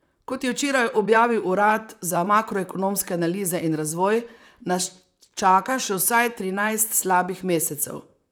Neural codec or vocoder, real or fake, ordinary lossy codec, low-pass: vocoder, 44.1 kHz, 128 mel bands, Pupu-Vocoder; fake; none; none